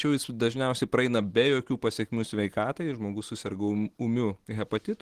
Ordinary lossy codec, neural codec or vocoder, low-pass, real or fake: Opus, 16 kbps; none; 14.4 kHz; real